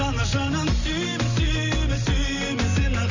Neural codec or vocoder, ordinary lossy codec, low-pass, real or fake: none; none; 7.2 kHz; real